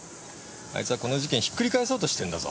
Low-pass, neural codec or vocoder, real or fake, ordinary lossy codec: none; none; real; none